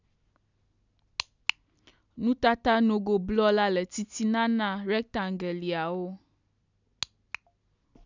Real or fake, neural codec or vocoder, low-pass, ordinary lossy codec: real; none; 7.2 kHz; none